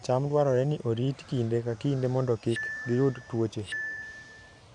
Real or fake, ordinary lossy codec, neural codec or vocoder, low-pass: fake; none; vocoder, 44.1 kHz, 128 mel bands every 512 samples, BigVGAN v2; 10.8 kHz